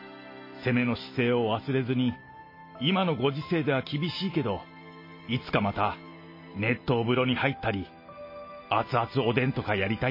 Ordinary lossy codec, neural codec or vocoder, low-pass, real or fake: MP3, 24 kbps; none; 5.4 kHz; real